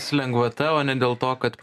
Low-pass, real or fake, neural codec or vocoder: 14.4 kHz; real; none